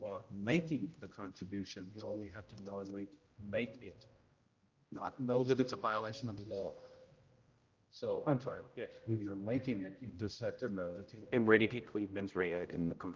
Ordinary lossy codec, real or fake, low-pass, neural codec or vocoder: Opus, 24 kbps; fake; 7.2 kHz; codec, 16 kHz, 0.5 kbps, X-Codec, HuBERT features, trained on general audio